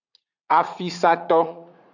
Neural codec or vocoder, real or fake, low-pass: vocoder, 44.1 kHz, 80 mel bands, Vocos; fake; 7.2 kHz